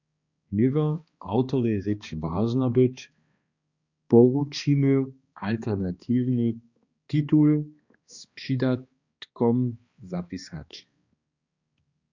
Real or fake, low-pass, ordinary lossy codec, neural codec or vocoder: fake; 7.2 kHz; Opus, 64 kbps; codec, 16 kHz, 2 kbps, X-Codec, HuBERT features, trained on balanced general audio